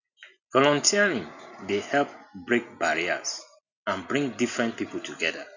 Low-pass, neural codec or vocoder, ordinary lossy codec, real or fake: 7.2 kHz; none; none; real